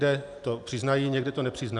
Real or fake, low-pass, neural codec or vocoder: real; 10.8 kHz; none